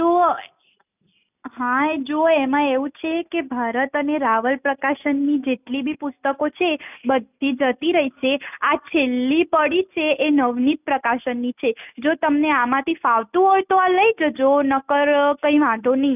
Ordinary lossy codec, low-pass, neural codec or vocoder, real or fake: none; 3.6 kHz; none; real